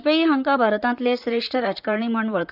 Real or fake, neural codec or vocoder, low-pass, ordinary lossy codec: fake; vocoder, 44.1 kHz, 128 mel bands, Pupu-Vocoder; 5.4 kHz; none